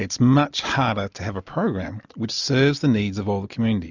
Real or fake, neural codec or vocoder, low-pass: real; none; 7.2 kHz